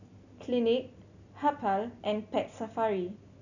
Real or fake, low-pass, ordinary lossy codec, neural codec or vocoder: real; 7.2 kHz; none; none